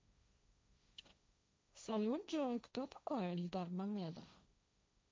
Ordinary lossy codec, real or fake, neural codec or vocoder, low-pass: none; fake; codec, 16 kHz, 1.1 kbps, Voila-Tokenizer; none